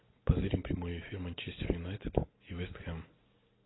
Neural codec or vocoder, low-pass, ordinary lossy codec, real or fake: none; 7.2 kHz; AAC, 16 kbps; real